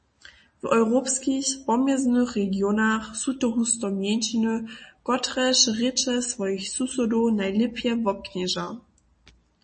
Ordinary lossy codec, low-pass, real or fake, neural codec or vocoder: MP3, 32 kbps; 9.9 kHz; real; none